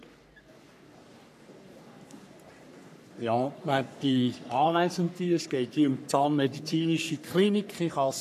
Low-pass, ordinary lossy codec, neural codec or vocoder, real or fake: 14.4 kHz; none; codec, 44.1 kHz, 3.4 kbps, Pupu-Codec; fake